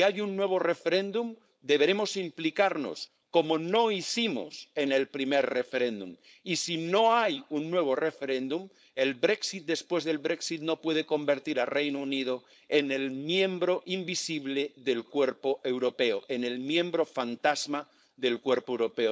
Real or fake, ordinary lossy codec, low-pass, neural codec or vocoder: fake; none; none; codec, 16 kHz, 4.8 kbps, FACodec